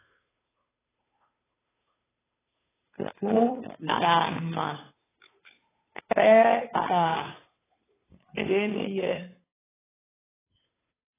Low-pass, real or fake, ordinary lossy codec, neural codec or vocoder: 3.6 kHz; fake; AAC, 16 kbps; codec, 16 kHz, 2 kbps, FunCodec, trained on Chinese and English, 25 frames a second